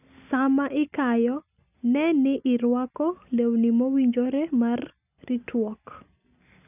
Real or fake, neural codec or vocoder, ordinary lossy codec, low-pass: real; none; none; 3.6 kHz